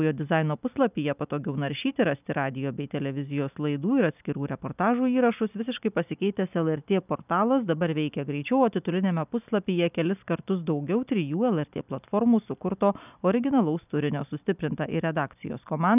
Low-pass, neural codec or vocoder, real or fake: 3.6 kHz; none; real